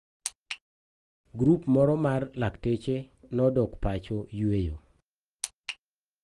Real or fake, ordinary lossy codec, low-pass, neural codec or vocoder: real; Opus, 24 kbps; 10.8 kHz; none